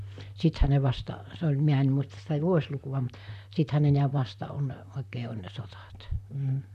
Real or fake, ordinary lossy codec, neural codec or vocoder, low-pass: fake; none; vocoder, 44.1 kHz, 128 mel bands, Pupu-Vocoder; 14.4 kHz